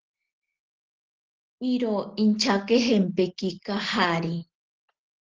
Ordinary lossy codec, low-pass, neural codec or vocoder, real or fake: Opus, 16 kbps; 7.2 kHz; none; real